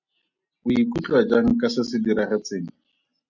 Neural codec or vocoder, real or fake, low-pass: none; real; 7.2 kHz